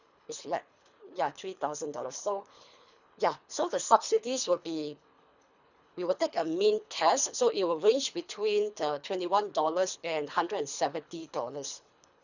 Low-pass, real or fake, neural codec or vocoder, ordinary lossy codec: 7.2 kHz; fake; codec, 24 kHz, 3 kbps, HILCodec; none